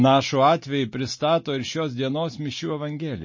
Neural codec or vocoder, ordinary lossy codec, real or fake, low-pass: none; MP3, 32 kbps; real; 7.2 kHz